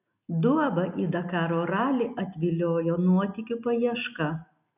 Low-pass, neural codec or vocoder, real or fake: 3.6 kHz; none; real